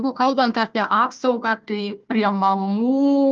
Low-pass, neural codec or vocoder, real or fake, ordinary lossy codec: 7.2 kHz; codec, 16 kHz, 1 kbps, FunCodec, trained on Chinese and English, 50 frames a second; fake; Opus, 32 kbps